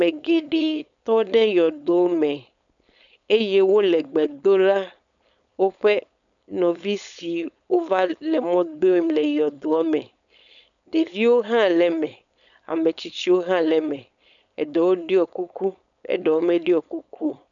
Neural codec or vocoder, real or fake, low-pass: codec, 16 kHz, 4.8 kbps, FACodec; fake; 7.2 kHz